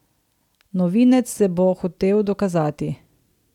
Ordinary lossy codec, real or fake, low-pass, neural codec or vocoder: none; real; 19.8 kHz; none